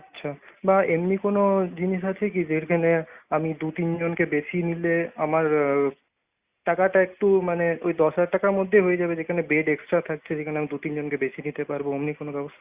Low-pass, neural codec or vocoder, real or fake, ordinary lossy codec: 3.6 kHz; none; real; Opus, 64 kbps